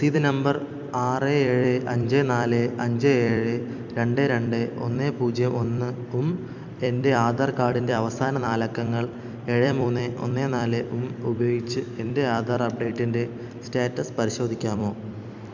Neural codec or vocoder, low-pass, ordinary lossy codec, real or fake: vocoder, 44.1 kHz, 128 mel bands every 256 samples, BigVGAN v2; 7.2 kHz; none; fake